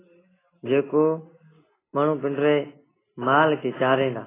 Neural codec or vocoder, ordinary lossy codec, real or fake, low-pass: none; AAC, 16 kbps; real; 3.6 kHz